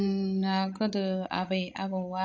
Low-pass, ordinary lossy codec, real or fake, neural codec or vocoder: 7.2 kHz; AAC, 48 kbps; fake; codec, 16 kHz, 16 kbps, FreqCodec, larger model